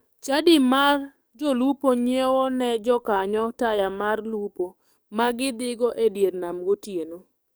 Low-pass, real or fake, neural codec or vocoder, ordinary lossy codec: none; fake; codec, 44.1 kHz, 7.8 kbps, DAC; none